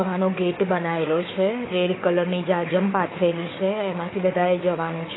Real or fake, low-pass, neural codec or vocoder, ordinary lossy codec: fake; 7.2 kHz; codec, 16 kHz, 4 kbps, FunCodec, trained on Chinese and English, 50 frames a second; AAC, 16 kbps